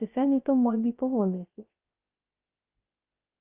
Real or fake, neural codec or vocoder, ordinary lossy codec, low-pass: fake; codec, 16 kHz, 0.3 kbps, FocalCodec; Opus, 24 kbps; 3.6 kHz